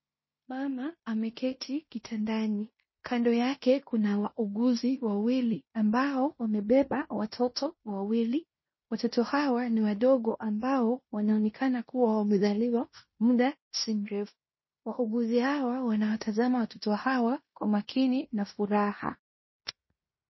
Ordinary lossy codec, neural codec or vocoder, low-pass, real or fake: MP3, 24 kbps; codec, 16 kHz in and 24 kHz out, 0.9 kbps, LongCat-Audio-Codec, fine tuned four codebook decoder; 7.2 kHz; fake